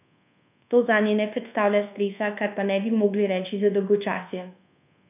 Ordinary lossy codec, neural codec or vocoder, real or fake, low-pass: none; codec, 24 kHz, 1.2 kbps, DualCodec; fake; 3.6 kHz